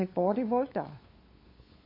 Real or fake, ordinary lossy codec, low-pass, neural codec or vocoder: fake; MP3, 24 kbps; 5.4 kHz; codec, 16 kHz, 8 kbps, FunCodec, trained on LibriTTS, 25 frames a second